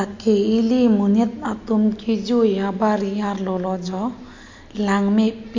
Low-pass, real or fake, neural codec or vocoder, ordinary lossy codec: 7.2 kHz; real; none; MP3, 48 kbps